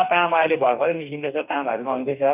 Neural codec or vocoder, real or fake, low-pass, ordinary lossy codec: codec, 44.1 kHz, 2.6 kbps, DAC; fake; 3.6 kHz; none